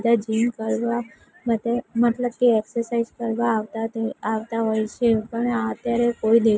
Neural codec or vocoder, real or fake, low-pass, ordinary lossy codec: none; real; none; none